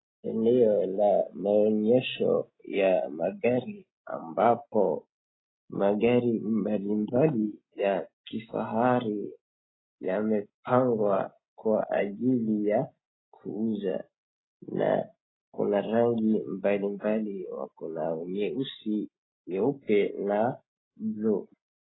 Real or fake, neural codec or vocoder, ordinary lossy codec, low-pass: real; none; AAC, 16 kbps; 7.2 kHz